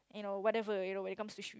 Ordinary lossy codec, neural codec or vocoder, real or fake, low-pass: none; none; real; none